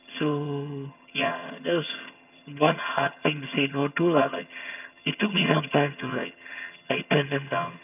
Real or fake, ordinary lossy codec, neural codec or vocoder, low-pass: fake; none; vocoder, 22.05 kHz, 80 mel bands, HiFi-GAN; 3.6 kHz